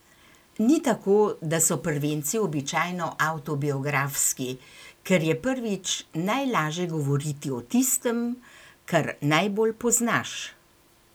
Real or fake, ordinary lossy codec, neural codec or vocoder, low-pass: real; none; none; none